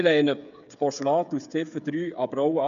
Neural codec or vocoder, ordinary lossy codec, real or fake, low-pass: codec, 16 kHz, 8 kbps, FreqCodec, smaller model; none; fake; 7.2 kHz